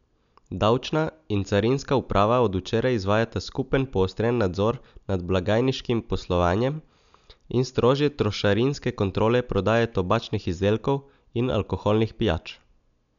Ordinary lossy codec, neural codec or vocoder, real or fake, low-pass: none; none; real; 7.2 kHz